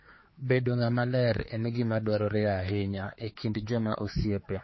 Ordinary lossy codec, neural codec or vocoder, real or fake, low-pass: MP3, 24 kbps; codec, 16 kHz, 4 kbps, X-Codec, HuBERT features, trained on general audio; fake; 7.2 kHz